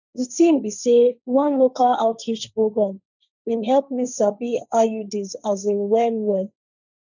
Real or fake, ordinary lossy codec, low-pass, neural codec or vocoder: fake; none; 7.2 kHz; codec, 16 kHz, 1.1 kbps, Voila-Tokenizer